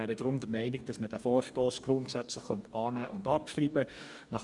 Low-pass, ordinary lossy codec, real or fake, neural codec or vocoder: 10.8 kHz; none; fake; codec, 44.1 kHz, 2.6 kbps, DAC